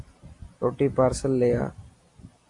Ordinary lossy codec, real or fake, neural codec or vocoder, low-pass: AAC, 48 kbps; real; none; 10.8 kHz